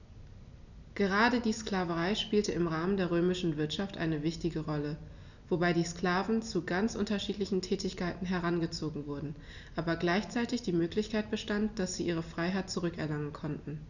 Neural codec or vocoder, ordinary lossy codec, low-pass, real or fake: none; none; 7.2 kHz; real